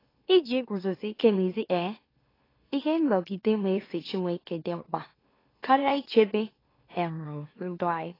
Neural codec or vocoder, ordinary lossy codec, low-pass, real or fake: autoencoder, 44.1 kHz, a latent of 192 numbers a frame, MeloTTS; AAC, 24 kbps; 5.4 kHz; fake